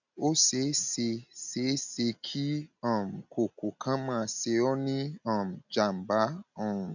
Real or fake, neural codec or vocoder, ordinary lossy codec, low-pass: real; none; none; 7.2 kHz